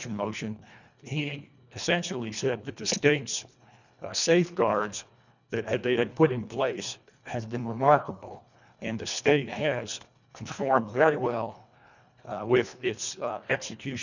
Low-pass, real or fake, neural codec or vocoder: 7.2 kHz; fake; codec, 24 kHz, 1.5 kbps, HILCodec